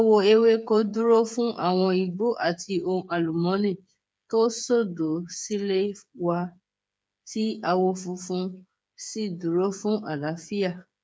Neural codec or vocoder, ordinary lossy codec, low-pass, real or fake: codec, 16 kHz, 8 kbps, FreqCodec, smaller model; none; none; fake